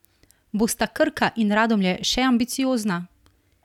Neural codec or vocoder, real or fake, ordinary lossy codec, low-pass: none; real; none; 19.8 kHz